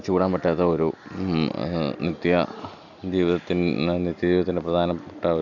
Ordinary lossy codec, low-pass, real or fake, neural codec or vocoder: none; 7.2 kHz; real; none